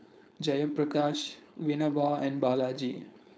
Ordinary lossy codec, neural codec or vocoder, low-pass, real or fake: none; codec, 16 kHz, 4.8 kbps, FACodec; none; fake